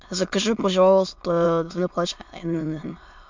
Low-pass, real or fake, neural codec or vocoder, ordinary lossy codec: 7.2 kHz; fake; autoencoder, 22.05 kHz, a latent of 192 numbers a frame, VITS, trained on many speakers; MP3, 64 kbps